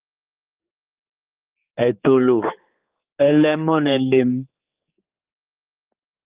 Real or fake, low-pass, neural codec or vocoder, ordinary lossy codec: fake; 3.6 kHz; codec, 16 kHz, 4 kbps, X-Codec, HuBERT features, trained on general audio; Opus, 24 kbps